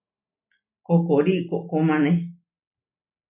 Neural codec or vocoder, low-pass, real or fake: none; 3.6 kHz; real